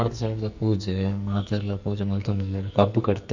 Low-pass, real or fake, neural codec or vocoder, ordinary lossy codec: 7.2 kHz; fake; codec, 44.1 kHz, 2.6 kbps, SNAC; none